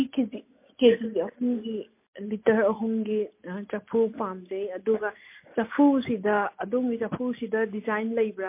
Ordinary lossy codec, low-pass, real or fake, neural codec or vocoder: MP3, 24 kbps; 3.6 kHz; real; none